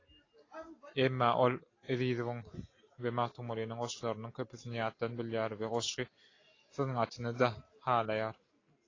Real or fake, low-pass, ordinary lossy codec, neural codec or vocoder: real; 7.2 kHz; AAC, 32 kbps; none